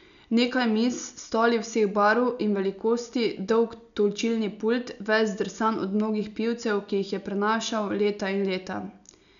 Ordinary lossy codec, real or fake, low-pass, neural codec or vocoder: none; real; 7.2 kHz; none